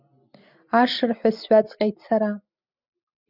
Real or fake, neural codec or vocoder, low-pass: real; none; 5.4 kHz